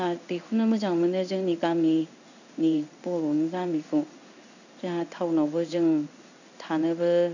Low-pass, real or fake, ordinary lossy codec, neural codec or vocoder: 7.2 kHz; fake; none; codec, 16 kHz in and 24 kHz out, 1 kbps, XY-Tokenizer